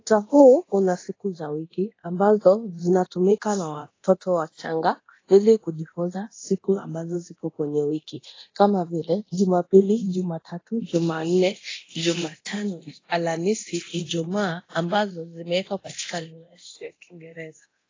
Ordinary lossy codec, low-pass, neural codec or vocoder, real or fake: AAC, 32 kbps; 7.2 kHz; codec, 24 kHz, 0.9 kbps, DualCodec; fake